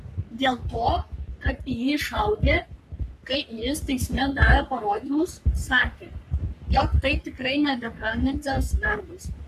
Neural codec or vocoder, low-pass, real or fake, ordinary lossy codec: codec, 44.1 kHz, 3.4 kbps, Pupu-Codec; 14.4 kHz; fake; AAC, 96 kbps